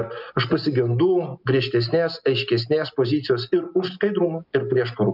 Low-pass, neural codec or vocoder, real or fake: 5.4 kHz; none; real